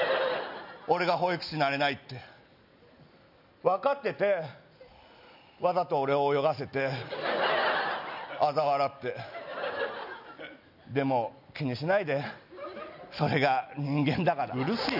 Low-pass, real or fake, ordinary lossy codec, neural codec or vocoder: 5.4 kHz; real; none; none